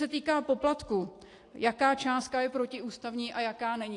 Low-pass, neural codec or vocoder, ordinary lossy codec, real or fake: 10.8 kHz; none; AAC, 48 kbps; real